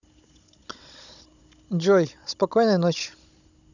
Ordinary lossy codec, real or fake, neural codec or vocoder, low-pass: none; fake; codec, 16 kHz, 16 kbps, FunCodec, trained on Chinese and English, 50 frames a second; 7.2 kHz